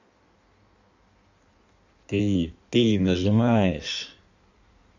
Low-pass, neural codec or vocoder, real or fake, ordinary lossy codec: 7.2 kHz; codec, 16 kHz in and 24 kHz out, 1.1 kbps, FireRedTTS-2 codec; fake; none